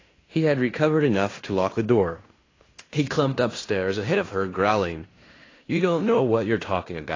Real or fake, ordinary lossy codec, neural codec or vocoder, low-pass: fake; AAC, 32 kbps; codec, 16 kHz in and 24 kHz out, 0.9 kbps, LongCat-Audio-Codec, fine tuned four codebook decoder; 7.2 kHz